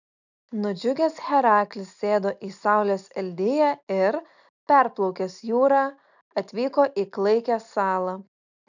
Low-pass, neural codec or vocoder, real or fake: 7.2 kHz; none; real